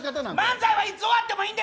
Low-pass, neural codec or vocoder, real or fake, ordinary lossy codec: none; none; real; none